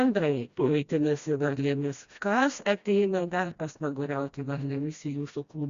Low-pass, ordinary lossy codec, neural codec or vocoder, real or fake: 7.2 kHz; Opus, 64 kbps; codec, 16 kHz, 1 kbps, FreqCodec, smaller model; fake